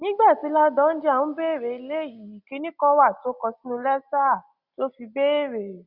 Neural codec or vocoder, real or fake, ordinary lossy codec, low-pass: none; real; Opus, 64 kbps; 5.4 kHz